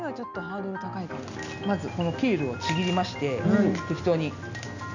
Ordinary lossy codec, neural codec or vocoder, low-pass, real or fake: none; none; 7.2 kHz; real